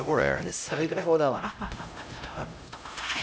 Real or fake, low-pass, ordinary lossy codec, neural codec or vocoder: fake; none; none; codec, 16 kHz, 0.5 kbps, X-Codec, HuBERT features, trained on LibriSpeech